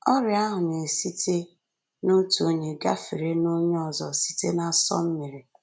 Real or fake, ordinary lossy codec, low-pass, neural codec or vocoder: real; none; none; none